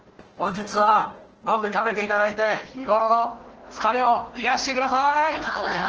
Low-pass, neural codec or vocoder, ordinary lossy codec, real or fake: 7.2 kHz; codec, 16 kHz, 1 kbps, FunCodec, trained on Chinese and English, 50 frames a second; Opus, 16 kbps; fake